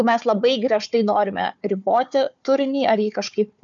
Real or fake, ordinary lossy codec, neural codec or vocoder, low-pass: fake; MP3, 96 kbps; codec, 16 kHz, 4 kbps, FunCodec, trained on Chinese and English, 50 frames a second; 7.2 kHz